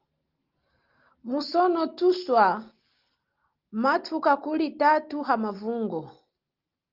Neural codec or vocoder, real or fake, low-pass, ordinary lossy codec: none; real; 5.4 kHz; Opus, 24 kbps